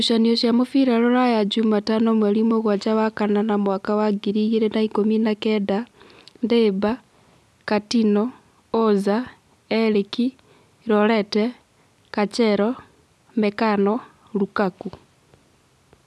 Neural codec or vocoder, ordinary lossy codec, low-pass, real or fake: none; none; none; real